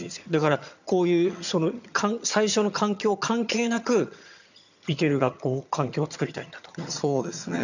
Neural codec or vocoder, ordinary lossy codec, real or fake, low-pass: vocoder, 22.05 kHz, 80 mel bands, HiFi-GAN; none; fake; 7.2 kHz